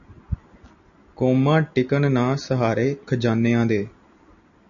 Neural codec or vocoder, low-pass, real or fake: none; 7.2 kHz; real